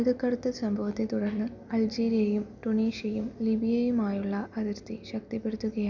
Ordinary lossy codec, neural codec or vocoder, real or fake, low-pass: none; none; real; 7.2 kHz